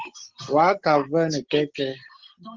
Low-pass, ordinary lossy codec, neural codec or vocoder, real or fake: 7.2 kHz; Opus, 16 kbps; none; real